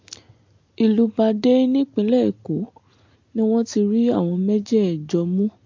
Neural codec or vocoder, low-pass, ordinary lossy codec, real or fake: none; 7.2 kHz; MP3, 48 kbps; real